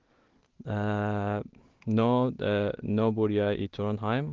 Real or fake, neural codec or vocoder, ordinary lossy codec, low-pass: real; none; Opus, 16 kbps; 7.2 kHz